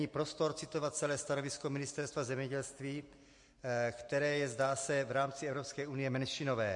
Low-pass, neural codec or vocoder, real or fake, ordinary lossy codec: 10.8 kHz; none; real; MP3, 48 kbps